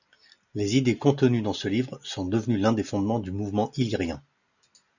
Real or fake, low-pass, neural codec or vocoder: real; 7.2 kHz; none